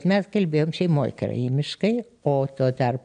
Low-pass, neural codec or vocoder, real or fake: 9.9 kHz; none; real